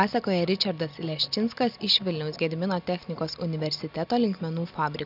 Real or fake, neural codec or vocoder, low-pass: real; none; 5.4 kHz